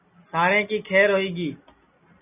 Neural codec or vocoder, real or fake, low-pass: none; real; 3.6 kHz